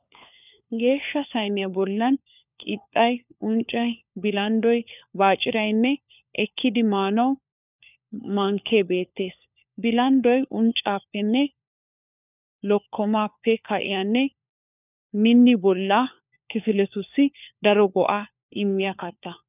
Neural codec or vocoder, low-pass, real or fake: codec, 16 kHz, 4 kbps, FunCodec, trained on LibriTTS, 50 frames a second; 3.6 kHz; fake